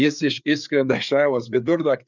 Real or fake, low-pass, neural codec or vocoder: fake; 7.2 kHz; codec, 16 kHz, 4 kbps, FreqCodec, larger model